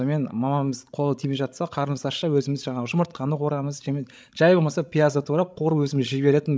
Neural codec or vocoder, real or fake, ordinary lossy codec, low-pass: codec, 16 kHz, 16 kbps, FreqCodec, larger model; fake; none; none